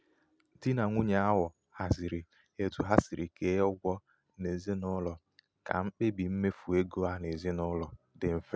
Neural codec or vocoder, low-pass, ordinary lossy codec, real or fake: none; none; none; real